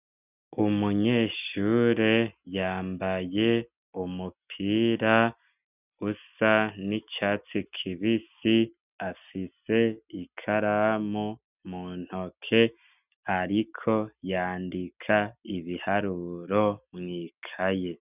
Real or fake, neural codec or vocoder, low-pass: real; none; 3.6 kHz